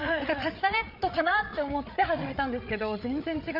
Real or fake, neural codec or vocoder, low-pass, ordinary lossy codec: fake; codec, 16 kHz, 8 kbps, FreqCodec, larger model; 5.4 kHz; none